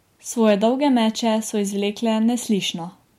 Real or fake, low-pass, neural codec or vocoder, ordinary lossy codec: real; 19.8 kHz; none; MP3, 64 kbps